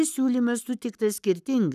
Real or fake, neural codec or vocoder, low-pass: fake; codec, 44.1 kHz, 7.8 kbps, Pupu-Codec; 14.4 kHz